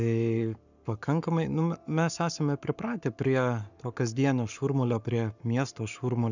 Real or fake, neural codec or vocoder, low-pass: fake; codec, 44.1 kHz, 7.8 kbps, Pupu-Codec; 7.2 kHz